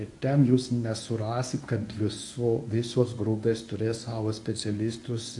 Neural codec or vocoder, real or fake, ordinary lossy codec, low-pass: codec, 24 kHz, 0.9 kbps, WavTokenizer, medium speech release version 1; fake; AAC, 64 kbps; 10.8 kHz